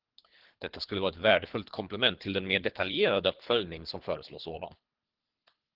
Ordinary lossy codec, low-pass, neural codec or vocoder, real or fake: Opus, 16 kbps; 5.4 kHz; codec, 24 kHz, 3 kbps, HILCodec; fake